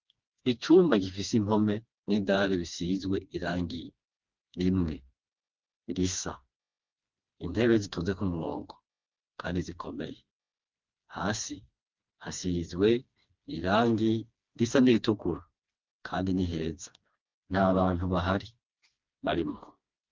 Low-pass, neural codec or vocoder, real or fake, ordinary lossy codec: 7.2 kHz; codec, 16 kHz, 2 kbps, FreqCodec, smaller model; fake; Opus, 24 kbps